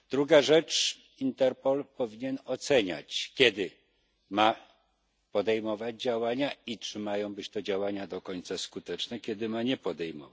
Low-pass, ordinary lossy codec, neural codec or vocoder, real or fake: none; none; none; real